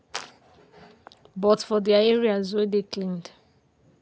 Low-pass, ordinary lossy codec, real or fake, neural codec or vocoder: none; none; real; none